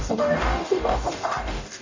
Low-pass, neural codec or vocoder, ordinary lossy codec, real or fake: 7.2 kHz; codec, 44.1 kHz, 0.9 kbps, DAC; AAC, 48 kbps; fake